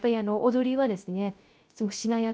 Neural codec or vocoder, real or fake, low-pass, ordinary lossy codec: codec, 16 kHz, 0.3 kbps, FocalCodec; fake; none; none